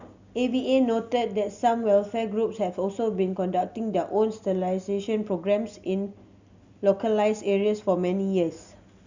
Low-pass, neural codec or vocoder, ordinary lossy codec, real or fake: 7.2 kHz; none; Opus, 64 kbps; real